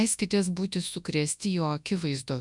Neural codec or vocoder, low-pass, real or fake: codec, 24 kHz, 0.9 kbps, WavTokenizer, large speech release; 10.8 kHz; fake